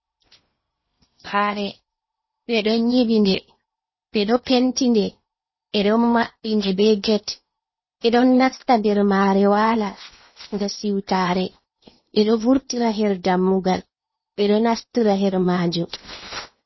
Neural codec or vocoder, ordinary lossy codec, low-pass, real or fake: codec, 16 kHz in and 24 kHz out, 0.8 kbps, FocalCodec, streaming, 65536 codes; MP3, 24 kbps; 7.2 kHz; fake